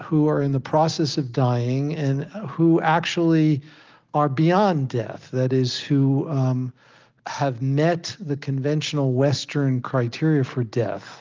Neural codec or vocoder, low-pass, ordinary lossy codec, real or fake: none; 7.2 kHz; Opus, 24 kbps; real